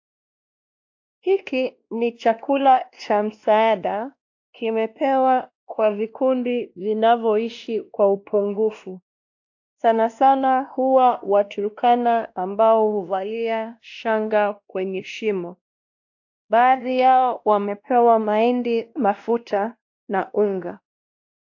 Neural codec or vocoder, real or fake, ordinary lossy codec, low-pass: codec, 16 kHz, 1 kbps, X-Codec, WavLM features, trained on Multilingual LibriSpeech; fake; AAC, 48 kbps; 7.2 kHz